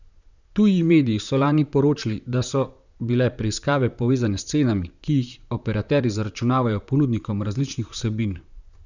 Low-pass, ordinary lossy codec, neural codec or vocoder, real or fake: 7.2 kHz; none; vocoder, 44.1 kHz, 128 mel bands, Pupu-Vocoder; fake